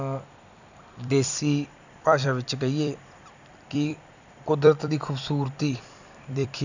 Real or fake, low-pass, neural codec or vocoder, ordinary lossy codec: fake; 7.2 kHz; vocoder, 44.1 kHz, 128 mel bands every 256 samples, BigVGAN v2; none